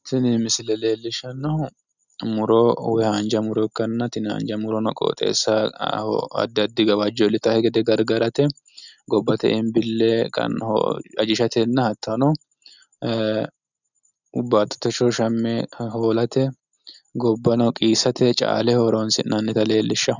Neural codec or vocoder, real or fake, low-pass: none; real; 7.2 kHz